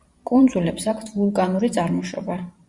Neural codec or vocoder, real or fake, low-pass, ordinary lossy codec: vocoder, 44.1 kHz, 128 mel bands every 512 samples, BigVGAN v2; fake; 10.8 kHz; Opus, 64 kbps